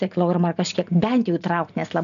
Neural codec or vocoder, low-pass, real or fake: none; 7.2 kHz; real